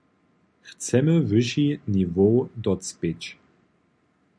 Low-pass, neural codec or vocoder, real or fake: 9.9 kHz; none; real